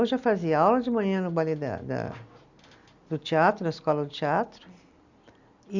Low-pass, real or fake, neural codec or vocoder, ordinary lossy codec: 7.2 kHz; real; none; Opus, 64 kbps